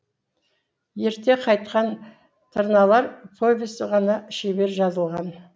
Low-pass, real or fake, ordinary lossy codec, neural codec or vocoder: none; real; none; none